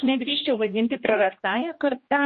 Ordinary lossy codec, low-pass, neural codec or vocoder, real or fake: MP3, 32 kbps; 7.2 kHz; codec, 16 kHz, 1 kbps, X-Codec, HuBERT features, trained on general audio; fake